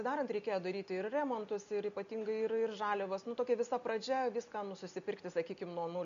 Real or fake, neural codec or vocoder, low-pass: real; none; 7.2 kHz